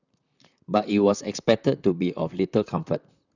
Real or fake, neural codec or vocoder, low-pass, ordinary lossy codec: fake; vocoder, 44.1 kHz, 128 mel bands, Pupu-Vocoder; 7.2 kHz; none